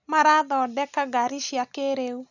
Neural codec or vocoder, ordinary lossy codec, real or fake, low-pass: none; none; real; 7.2 kHz